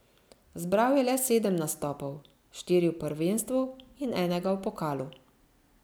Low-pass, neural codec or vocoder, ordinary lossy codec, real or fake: none; none; none; real